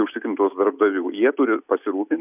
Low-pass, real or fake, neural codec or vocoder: 3.6 kHz; real; none